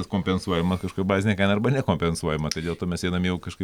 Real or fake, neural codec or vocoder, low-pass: real; none; 19.8 kHz